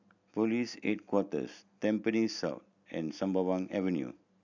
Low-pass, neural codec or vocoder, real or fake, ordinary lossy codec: 7.2 kHz; none; real; none